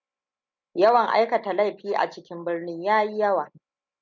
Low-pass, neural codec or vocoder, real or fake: 7.2 kHz; none; real